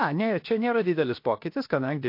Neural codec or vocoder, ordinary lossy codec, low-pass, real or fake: codec, 16 kHz, 0.7 kbps, FocalCodec; MP3, 32 kbps; 5.4 kHz; fake